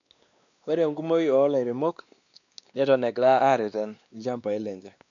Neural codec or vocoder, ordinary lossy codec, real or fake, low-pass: codec, 16 kHz, 2 kbps, X-Codec, WavLM features, trained on Multilingual LibriSpeech; none; fake; 7.2 kHz